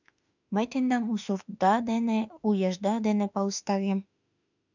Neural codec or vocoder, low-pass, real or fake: autoencoder, 48 kHz, 32 numbers a frame, DAC-VAE, trained on Japanese speech; 7.2 kHz; fake